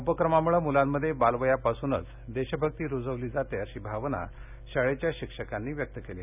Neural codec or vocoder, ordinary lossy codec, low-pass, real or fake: none; none; 3.6 kHz; real